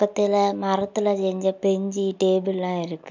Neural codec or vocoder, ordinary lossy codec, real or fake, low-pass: none; none; real; 7.2 kHz